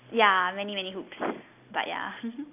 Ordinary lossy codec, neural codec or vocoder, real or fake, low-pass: AAC, 32 kbps; none; real; 3.6 kHz